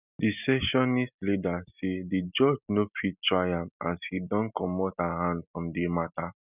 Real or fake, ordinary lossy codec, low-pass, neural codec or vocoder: real; none; 3.6 kHz; none